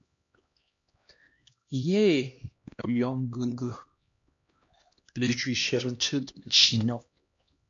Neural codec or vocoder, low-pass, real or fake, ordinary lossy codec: codec, 16 kHz, 1 kbps, X-Codec, HuBERT features, trained on LibriSpeech; 7.2 kHz; fake; MP3, 48 kbps